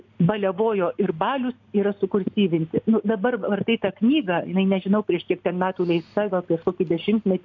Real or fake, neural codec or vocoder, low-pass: real; none; 7.2 kHz